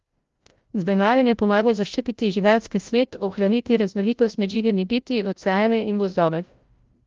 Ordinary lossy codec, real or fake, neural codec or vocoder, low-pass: Opus, 32 kbps; fake; codec, 16 kHz, 0.5 kbps, FreqCodec, larger model; 7.2 kHz